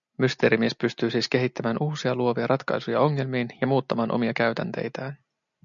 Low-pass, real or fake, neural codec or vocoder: 7.2 kHz; real; none